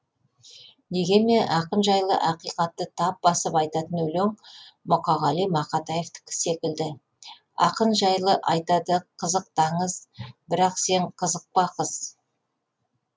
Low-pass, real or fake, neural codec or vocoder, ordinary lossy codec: none; real; none; none